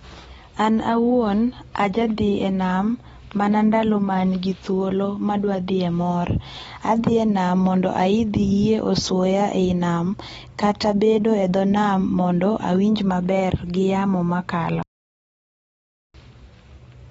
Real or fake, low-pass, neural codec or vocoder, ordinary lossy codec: real; 19.8 kHz; none; AAC, 24 kbps